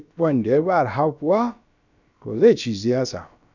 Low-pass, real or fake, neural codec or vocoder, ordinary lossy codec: 7.2 kHz; fake; codec, 16 kHz, about 1 kbps, DyCAST, with the encoder's durations; none